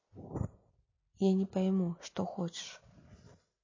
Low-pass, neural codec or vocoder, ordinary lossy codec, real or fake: 7.2 kHz; none; MP3, 32 kbps; real